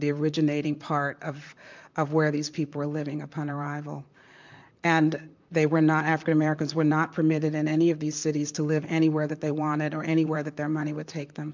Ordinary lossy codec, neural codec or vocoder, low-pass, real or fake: MP3, 64 kbps; vocoder, 44.1 kHz, 128 mel bands, Pupu-Vocoder; 7.2 kHz; fake